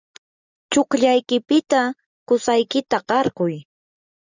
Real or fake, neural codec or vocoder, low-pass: real; none; 7.2 kHz